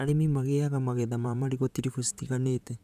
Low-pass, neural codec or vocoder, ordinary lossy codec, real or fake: 14.4 kHz; vocoder, 44.1 kHz, 128 mel bands, Pupu-Vocoder; none; fake